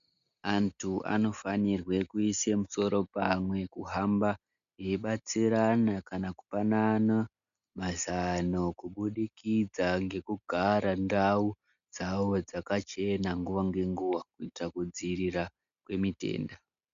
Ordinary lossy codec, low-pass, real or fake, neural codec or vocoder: MP3, 96 kbps; 7.2 kHz; real; none